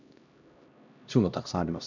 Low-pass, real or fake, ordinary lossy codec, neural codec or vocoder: 7.2 kHz; fake; none; codec, 16 kHz, 1 kbps, X-Codec, HuBERT features, trained on LibriSpeech